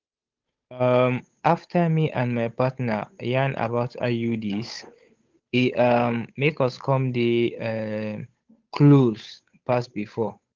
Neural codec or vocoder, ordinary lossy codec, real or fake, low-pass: codec, 16 kHz, 8 kbps, FunCodec, trained on Chinese and English, 25 frames a second; Opus, 32 kbps; fake; 7.2 kHz